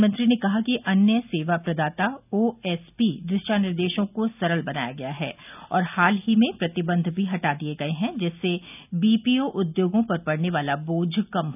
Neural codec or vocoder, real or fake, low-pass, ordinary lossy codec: none; real; 3.6 kHz; none